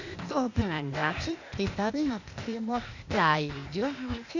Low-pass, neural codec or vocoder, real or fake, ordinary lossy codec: 7.2 kHz; codec, 16 kHz, 0.8 kbps, ZipCodec; fake; none